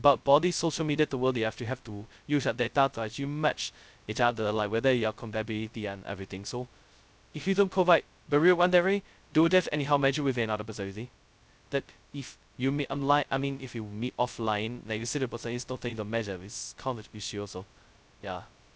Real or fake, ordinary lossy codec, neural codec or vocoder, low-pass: fake; none; codec, 16 kHz, 0.2 kbps, FocalCodec; none